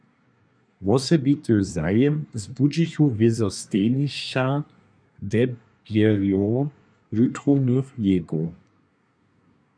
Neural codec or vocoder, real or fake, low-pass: codec, 24 kHz, 1 kbps, SNAC; fake; 9.9 kHz